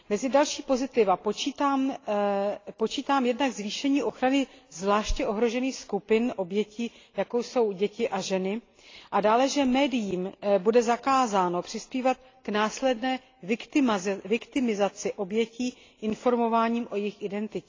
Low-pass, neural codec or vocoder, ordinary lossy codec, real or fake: 7.2 kHz; none; AAC, 32 kbps; real